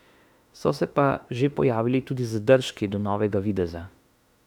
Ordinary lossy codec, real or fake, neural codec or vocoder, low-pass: none; fake; autoencoder, 48 kHz, 32 numbers a frame, DAC-VAE, trained on Japanese speech; 19.8 kHz